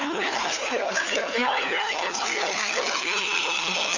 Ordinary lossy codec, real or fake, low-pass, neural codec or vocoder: none; fake; 7.2 kHz; codec, 16 kHz, 4 kbps, FunCodec, trained on LibriTTS, 50 frames a second